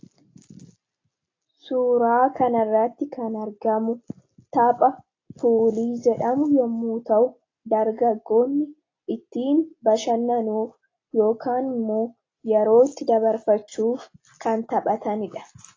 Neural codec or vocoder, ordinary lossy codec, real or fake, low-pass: none; AAC, 32 kbps; real; 7.2 kHz